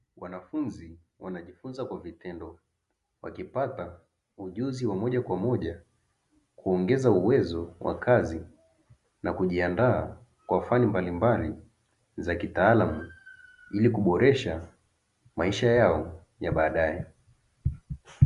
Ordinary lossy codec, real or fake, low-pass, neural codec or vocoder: Opus, 64 kbps; real; 10.8 kHz; none